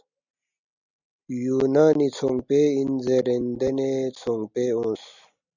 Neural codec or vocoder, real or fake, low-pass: none; real; 7.2 kHz